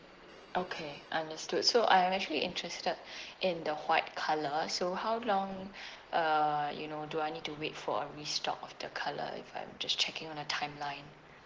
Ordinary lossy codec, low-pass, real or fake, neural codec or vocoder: Opus, 16 kbps; 7.2 kHz; real; none